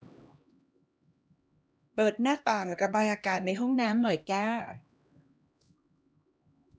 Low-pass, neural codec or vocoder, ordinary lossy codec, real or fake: none; codec, 16 kHz, 1 kbps, X-Codec, HuBERT features, trained on LibriSpeech; none; fake